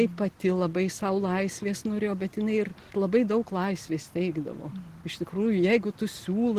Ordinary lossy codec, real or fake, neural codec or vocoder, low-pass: Opus, 16 kbps; real; none; 14.4 kHz